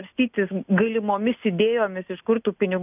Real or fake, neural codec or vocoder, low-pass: real; none; 3.6 kHz